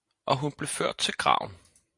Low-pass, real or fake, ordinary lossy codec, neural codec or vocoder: 10.8 kHz; real; MP3, 64 kbps; none